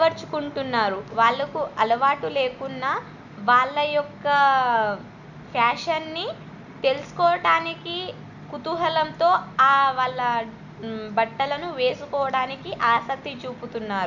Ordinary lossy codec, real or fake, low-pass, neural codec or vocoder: none; real; 7.2 kHz; none